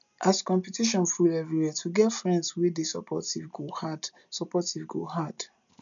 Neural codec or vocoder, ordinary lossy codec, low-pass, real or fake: none; MP3, 96 kbps; 7.2 kHz; real